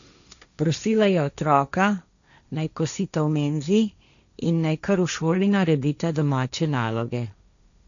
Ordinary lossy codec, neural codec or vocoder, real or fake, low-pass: none; codec, 16 kHz, 1.1 kbps, Voila-Tokenizer; fake; 7.2 kHz